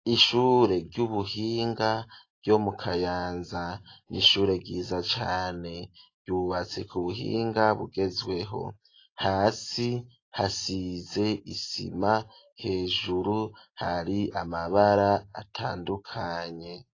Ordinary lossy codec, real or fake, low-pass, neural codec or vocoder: AAC, 32 kbps; real; 7.2 kHz; none